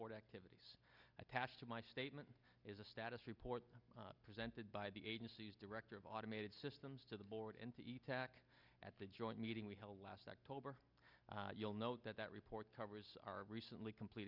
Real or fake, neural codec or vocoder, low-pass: real; none; 5.4 kHz